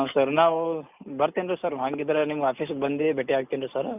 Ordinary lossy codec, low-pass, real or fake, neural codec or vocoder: none; 3.6 kHz; real; none